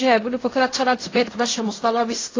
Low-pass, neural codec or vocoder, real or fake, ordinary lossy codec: 7.2 kHz; codec, 16 kHz in and 24 kHz out, 0.4 kbps, LongCat-Audio-Codec, fine tuned four codebook decoder; fake; AAC, 32 kbps